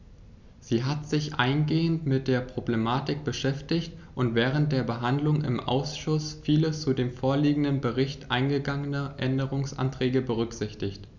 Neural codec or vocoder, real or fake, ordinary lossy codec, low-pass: none; real; none; 7.2 kHz